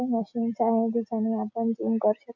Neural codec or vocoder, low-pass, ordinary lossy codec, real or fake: none; 7.2 kHz; none; real